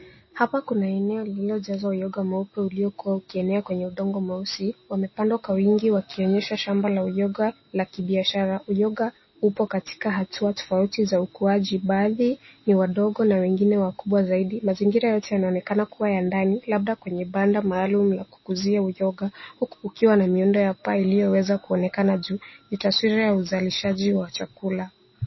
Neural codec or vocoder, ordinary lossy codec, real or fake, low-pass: none; MP3, 24 kbps; real; 7.2 kHz